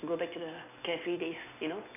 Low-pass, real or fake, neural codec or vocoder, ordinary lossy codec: 3.6 kHz; real; none; none